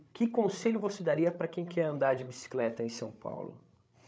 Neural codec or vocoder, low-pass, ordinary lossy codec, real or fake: codec, 16 kHz, 16 kbps, FreqCodec, larger model; none; none; fake